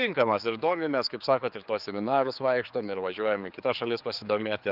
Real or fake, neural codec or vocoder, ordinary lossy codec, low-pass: fake; codec, 16 kHz, 4 kbps, X-Codec, HuBERT features, trained on general audio; Opus, 24 kbps; 5.4 kHz